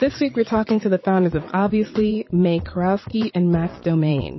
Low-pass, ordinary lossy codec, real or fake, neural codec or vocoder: 7.2 kHz; MP3, 24 kbps; fake; vocoder, 22.05 kHz, 80 mel bands, Vocos